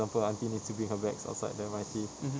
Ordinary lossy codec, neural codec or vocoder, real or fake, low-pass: none; none; real; none